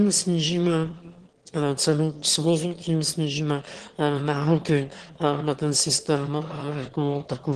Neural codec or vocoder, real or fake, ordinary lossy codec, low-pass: autoencoder, 22.05 kHz, a latent of 192 numbers a frame, VITS, trained on one speaker; fake; Opus, 16 kbps; 9.9 kHz